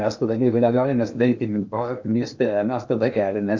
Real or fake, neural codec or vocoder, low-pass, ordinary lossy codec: fake; codec, 16 kHz in and 24 kHz out, 0.8 kbps, FocalCodec, streaming, 65536 codes; 7.2 kHz; MP3, 64 kbps